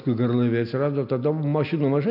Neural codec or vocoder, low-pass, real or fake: none; 5.4 kHz; real